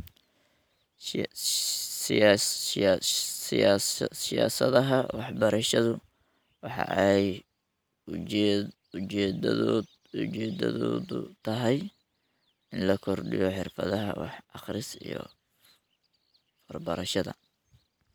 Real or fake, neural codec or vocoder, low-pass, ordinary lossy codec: real; none; none; none